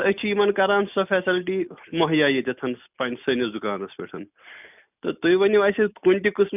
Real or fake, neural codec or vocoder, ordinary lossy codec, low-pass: real; none; none; 3.6 kHz